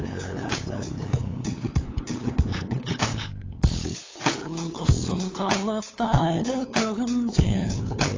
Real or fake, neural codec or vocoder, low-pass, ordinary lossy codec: fake; codec, 16 kHz, 8 kbps, FunCodec, trained on LibriTTS, 25 frames a second; 7.2 kHz; AAC, 32 kbps